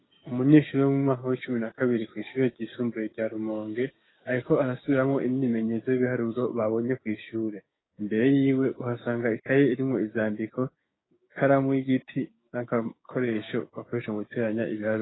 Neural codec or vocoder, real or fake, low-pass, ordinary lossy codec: codec, 16 kHz, 6 kbps, DAC; fake; 7.2 kHz; AAC, 16 kbps